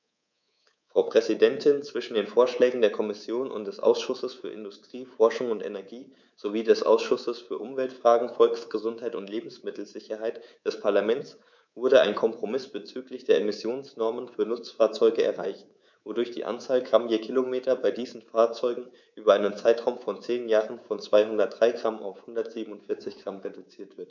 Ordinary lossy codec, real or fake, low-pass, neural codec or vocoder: none; fake; 7.2 kHz; codec, 24 kHz, 3.1 kbps, DualCodec